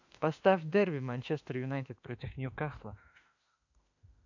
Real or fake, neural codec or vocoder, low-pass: fake; autoencoder, 48 kHz, 32 numbers a frame, DAC-VAE, trained on Japanese speech; 7.2 kHz